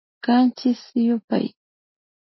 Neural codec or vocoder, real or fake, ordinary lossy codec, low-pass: none; real; MP3, 24 kbps; 7.2 kHz